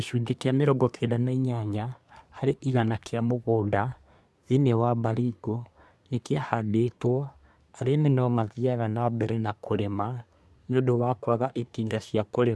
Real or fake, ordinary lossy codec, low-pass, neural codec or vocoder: fake; none; none; codec, 24 kHz, 1 kbps, SNAC